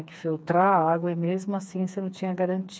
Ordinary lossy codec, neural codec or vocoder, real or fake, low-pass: none; codec, 16 kHz, 4 kbps, FreqCodec, smaller model; fake; none